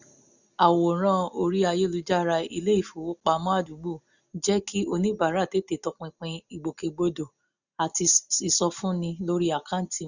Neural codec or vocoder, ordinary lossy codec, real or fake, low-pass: none; none; real; 7.2 kHz